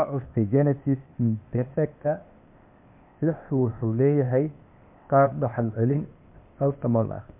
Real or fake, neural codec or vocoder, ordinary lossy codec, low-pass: fake; codec, 16 kHz, 0.8 kbps, ZipCodec; MP3, 32 kbps; 3.6 kHz